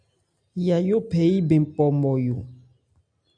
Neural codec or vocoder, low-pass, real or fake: none; 9.9 kHz; real